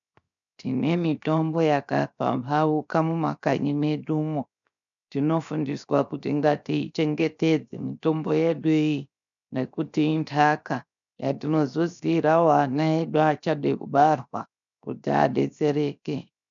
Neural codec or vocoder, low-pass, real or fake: codec, 16 kHz, 0.7 kbps, FocalCodec; 7.2 kHz; fake